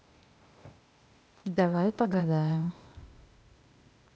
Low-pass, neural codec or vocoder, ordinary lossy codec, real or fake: none; codec, 16 kHz, 0.8 kbps, ZipCodec; none; fake